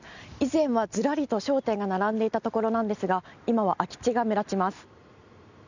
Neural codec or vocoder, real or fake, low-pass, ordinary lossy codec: none; real; 7.2 kHz; none